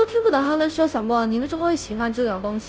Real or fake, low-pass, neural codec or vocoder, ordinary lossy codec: fake; none; codec, 16 kHz, 0.5 kbps, FunCodec, trained on Chinese and English, 25 frames a second; none